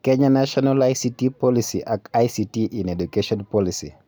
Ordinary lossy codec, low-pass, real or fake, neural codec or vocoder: none; none; real; none